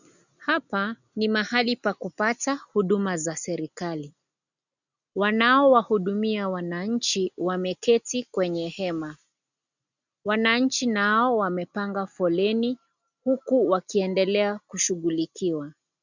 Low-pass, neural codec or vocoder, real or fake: 7.2 kHz; none; real